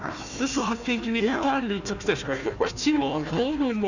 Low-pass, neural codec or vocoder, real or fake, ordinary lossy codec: 7.2 kHz; codec, 16 kHz, 1 kbps, FunCodec, trained on Chinese and English, 50 frames a second; fake; none